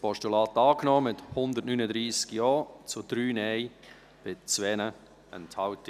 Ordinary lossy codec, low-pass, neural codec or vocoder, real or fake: none; 14.4 kHz; none; real